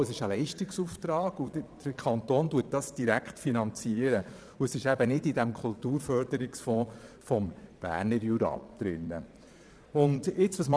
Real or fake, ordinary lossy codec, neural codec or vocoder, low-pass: fake; none; vocoder, 22.05 kHz, 80 mel bands, Vocos; none